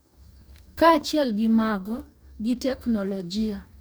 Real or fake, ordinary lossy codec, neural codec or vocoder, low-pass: fake; none; codec, 44.1 kHz, 2.6 kbps, DAC; none